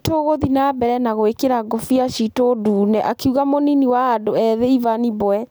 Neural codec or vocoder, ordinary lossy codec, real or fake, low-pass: none; none; real; none